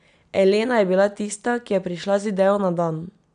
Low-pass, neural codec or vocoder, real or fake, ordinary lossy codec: 9.9 kHz; none; real; none